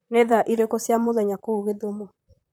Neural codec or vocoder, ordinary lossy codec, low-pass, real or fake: vocoder, 44.1 kHz, 128 mel bands, Pupu-Vocoder; none; none; fake